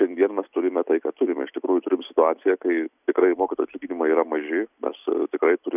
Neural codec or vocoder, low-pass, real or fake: none; 3.6 kHz; real